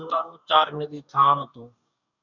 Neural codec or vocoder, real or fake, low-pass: codec, 44.1 kHz, 2.6 kbps, DAC; fake; 7.2 kHz